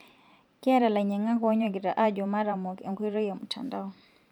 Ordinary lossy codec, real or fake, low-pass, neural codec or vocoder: none; fake; 19.8 kHz; vocoder, 44.1 kHz, 128 mel bands every 512 samples, BigVGAN v2